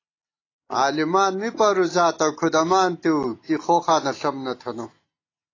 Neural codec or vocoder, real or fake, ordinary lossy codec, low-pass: none; real; AAC, 32 kbps; 7.2 kHz